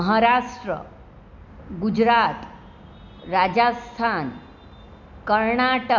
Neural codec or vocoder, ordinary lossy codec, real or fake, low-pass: none; none; real; 7.2 kHz